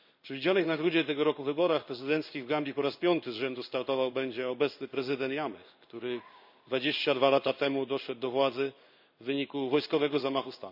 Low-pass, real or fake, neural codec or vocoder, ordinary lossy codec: 5.4 kHz; fake; codec, 16 kHz in and 24 kHz out, 1 kbps, XY-Tokenizer; none